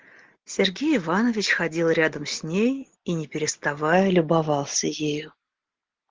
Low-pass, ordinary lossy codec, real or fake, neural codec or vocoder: 7.2 kHz; Opus, 16 kbps; real; none